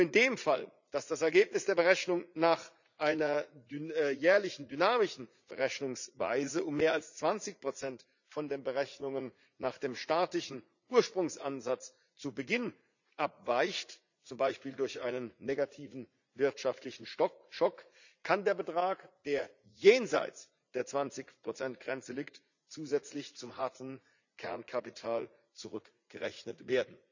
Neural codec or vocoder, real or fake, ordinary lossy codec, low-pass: vocoder, 44.1 kHz, 80 mel bands, Vocos; fake; none; 7.2 kHz